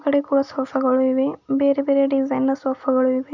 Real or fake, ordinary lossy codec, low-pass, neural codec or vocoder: real; none; 7.2 kHz; none